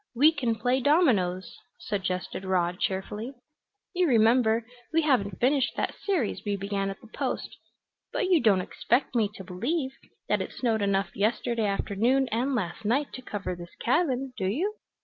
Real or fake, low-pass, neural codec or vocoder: real; 7.2 kHz; none